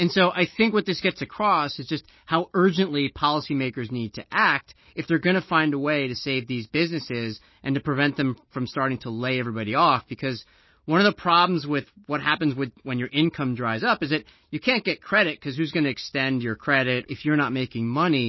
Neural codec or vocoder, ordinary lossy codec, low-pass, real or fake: none; MP3, 24 kbps; 7.2 kHz; real